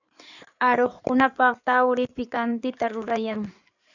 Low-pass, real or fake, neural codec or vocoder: 7.2 kHz; fake; codec, 16 kHz in and 24 kHz out, 2.2 kbps, FireRedTTS-2 codec